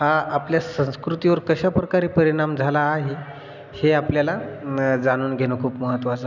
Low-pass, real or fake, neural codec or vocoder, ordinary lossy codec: 7.2 kHz; real; none; none